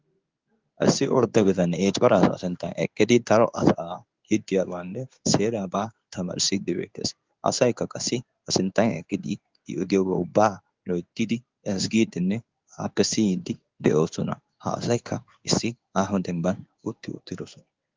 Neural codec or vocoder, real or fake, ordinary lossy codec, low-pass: codec, 16 kHz in and 24 kHz out, 1 kbps, XY-Tokenizer; fake; Opus, 32 kbps; 7.2 kHz